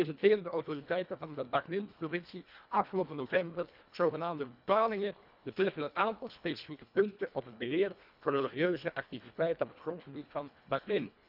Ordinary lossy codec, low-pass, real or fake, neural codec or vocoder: none; 5.4 kHz; fake; codec, 24 kHz, 1.5 kbps, HILCodec